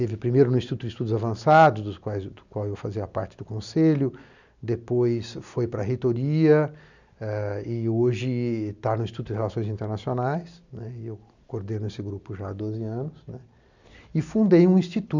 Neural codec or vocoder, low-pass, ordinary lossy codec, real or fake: none; 7.2 kHz; none; real